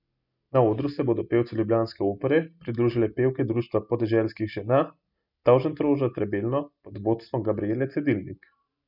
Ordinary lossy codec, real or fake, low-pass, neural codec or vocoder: none; real; 5.4 kHz; none